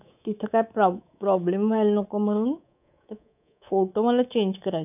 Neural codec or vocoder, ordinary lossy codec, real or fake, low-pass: codec, 24 kHz, 3.1 kbps, DualCodec; none; fake; 3.6 kHz